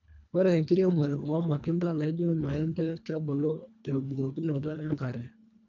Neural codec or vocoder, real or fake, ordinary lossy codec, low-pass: codec, 24 kHz, 1.5 kbps, HILCodec; fake; none; 7.2 kHz